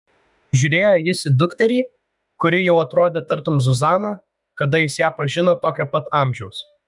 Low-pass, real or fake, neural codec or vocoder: 10.8 kHz; fake; autoencoder, 48 kHz, 32 numbers a frame, DAC-VAE, trained on Japanese speech